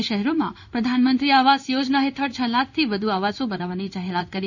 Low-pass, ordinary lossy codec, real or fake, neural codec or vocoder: 7.2 kHz; none; fake; codec, 16 kHz in and 24 kHz out, 1 kbps, XY-Tokenizer